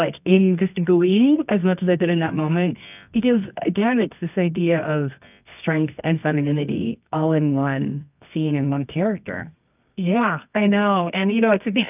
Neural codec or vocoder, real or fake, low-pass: codec, 24 kHz, 0.9 kbps, WavTokenizer, medium music audio release; fake; 3.6 kHz